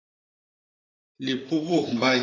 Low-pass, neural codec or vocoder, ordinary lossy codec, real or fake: 7.2 kHz; vocoder, 44.1 kHz, 128 mel bands, Pupu-Vocoder; AAC, 32 kbps; fake